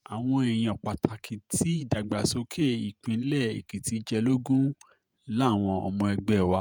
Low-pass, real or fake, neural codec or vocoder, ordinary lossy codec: none; fake; vocoder, 48 kHz, 128 mel bands, Vocos; none